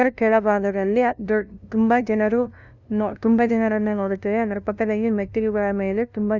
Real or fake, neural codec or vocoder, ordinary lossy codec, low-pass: fake; codec, 16 kHz, 0.5 kbps, FunCodec, trained on LibriTTS, 25 frames a second; none; 7.2 kHz